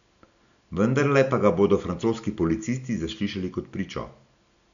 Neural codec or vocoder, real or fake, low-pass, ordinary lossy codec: none; real; 7.2 kHz; none